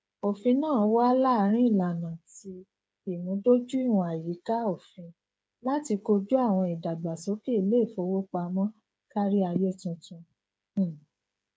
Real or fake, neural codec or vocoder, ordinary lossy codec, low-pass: fake; codec, 16 kHz, 8 kbps, FreqCodec, smaller model; none; none